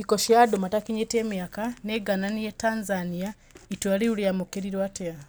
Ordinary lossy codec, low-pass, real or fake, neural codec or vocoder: none; none; real; none